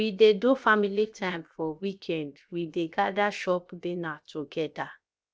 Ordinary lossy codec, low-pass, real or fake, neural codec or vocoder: none; none; fake; codec, 16 kHz, 0.7 kbps, FocalCodec